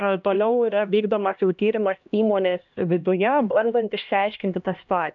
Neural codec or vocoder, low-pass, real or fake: codec, 16 kHz, 1 kbps, X-Codec, HuBERT features, trained on LibriSpeech; 7.2 kHz; fake